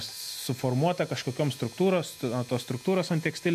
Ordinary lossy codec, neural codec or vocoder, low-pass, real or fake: MP3, 64 kbps; none; 14.4 kHz; real